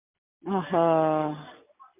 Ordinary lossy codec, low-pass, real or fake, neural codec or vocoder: MP3, 32 kbps; 3.6 kHz; real; none